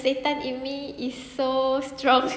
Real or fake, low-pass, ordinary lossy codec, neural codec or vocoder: real; none; none; none